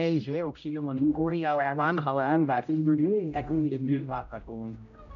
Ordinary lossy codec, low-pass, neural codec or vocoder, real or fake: none; 7.2 kHz; codec, 16 kHz, 0.5 kbps, X-Codec, HuBERT features, trained on general audio; fake